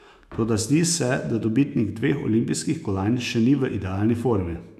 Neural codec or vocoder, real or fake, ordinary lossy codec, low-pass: autoencoder, 48 kHz, 128 numbers a frame, DAC-VAE, trained on Japanese speech; fake; none; 14.4 kHz